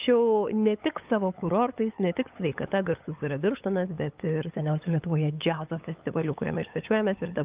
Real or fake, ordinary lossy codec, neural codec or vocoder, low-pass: fake; Opus, 32 kbps; codec, 16 kHz, 8 kbps, FunCodec, trained on LibriTTS, 25 frames a second; 3.6 kHz